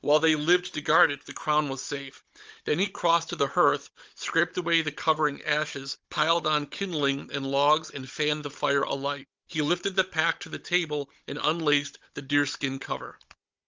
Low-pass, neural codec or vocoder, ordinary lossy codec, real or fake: 7.2 kHz; codec, 16 kHz, 16 kbps, FunCodec, trained on Chinese and English, 50 frames a second; Opus, 24 kbps; fake